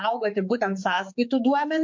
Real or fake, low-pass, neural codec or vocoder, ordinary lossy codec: fake; 7.2 kHz; codec, 16 kHz, 4 kbps, X-Codec, HuBERT features, trained on general audio; MP3, 48 kbps